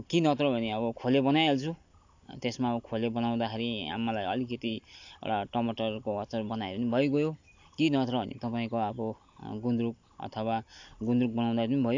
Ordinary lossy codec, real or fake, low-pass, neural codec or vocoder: AAC, 48 kbps; real; 7.2 kHz; none